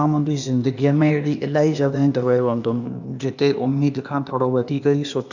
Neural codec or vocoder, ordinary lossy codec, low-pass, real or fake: codec, 16 kHz, 0.8 kbps, ZipCodec; none; 7.2 kHz; fake